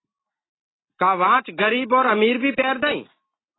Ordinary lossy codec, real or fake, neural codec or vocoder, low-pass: AAC, 16 kbps; real; none; 7.2 kHz